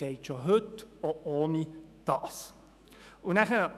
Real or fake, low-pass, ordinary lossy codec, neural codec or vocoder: fake; 14.4 kHz; none; autoencoder, 48 kHz, 128 numbers a frame, DAC-VAE, trained on Japanese speech